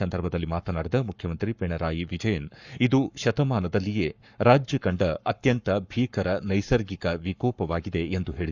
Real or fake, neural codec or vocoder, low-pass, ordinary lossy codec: fake; codec, 44.1 kHz, 7.8 kbps, Pupu-Codec; 7.2 kHz; none